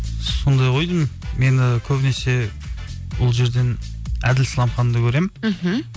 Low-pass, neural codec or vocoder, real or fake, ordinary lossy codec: none; none; real; none